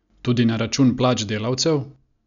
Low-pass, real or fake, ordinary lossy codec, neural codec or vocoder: 7.2 kHz; real; none; none